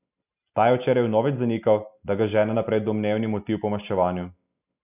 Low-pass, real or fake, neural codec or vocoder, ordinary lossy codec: 3.6 kHz; real; none; none